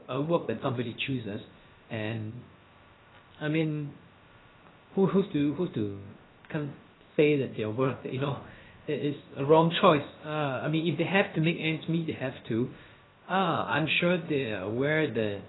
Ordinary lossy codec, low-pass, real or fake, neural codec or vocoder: AAC, 16 kbps; 7.2 kHz; fake; codec, 16 kHz, about 1 kbps, DyCAST, with the encoder's durations